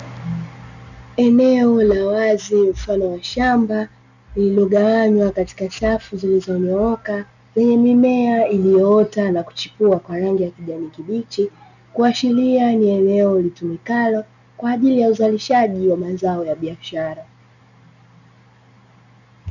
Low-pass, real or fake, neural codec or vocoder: 7.2 kHz; real; none